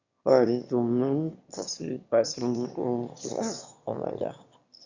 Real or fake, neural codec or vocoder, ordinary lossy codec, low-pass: fake; autoencoder, 22.05 kHz, a latent of 192 numbers a frame, VITS, trained on one speaker; Opus, 64 kbps; 7.2 kHz